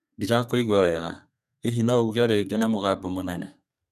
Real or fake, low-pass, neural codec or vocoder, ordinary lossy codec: fake; 14.4 kHz; codec, 32 kHz, 1.9 kbps, SNAC; none